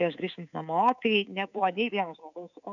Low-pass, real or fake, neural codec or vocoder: 7.2 kHz; fake; codec, 24 kHz, 3.1 kbps, DualCodec